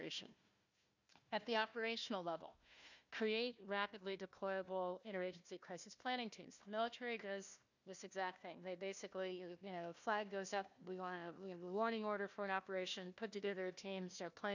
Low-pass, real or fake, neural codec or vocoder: 7.2 kHz; fake; codec, 16 kHz, 1 kbps, FunCodec, trained on Chinese and English, 50 frames a second